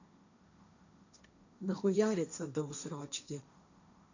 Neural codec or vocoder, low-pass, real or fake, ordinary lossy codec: codec, 16 kHz, 1.1 kbps, Voila-Tokenizer; 7.2 kHz; fake; none